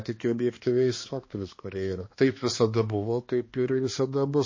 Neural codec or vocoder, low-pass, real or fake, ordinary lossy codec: codec, 16 kHz, 2 kbps, X-Codec, HuBERT features, trained on balanced general audio; 7.2 kHz; fake; MP3, 32 kbps